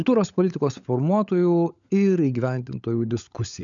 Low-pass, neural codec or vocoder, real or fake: 7.2 kHz; codec, 16 kHz, 16 kbps, FunCodec, trained on LibriTTS, 50 frames a second; fake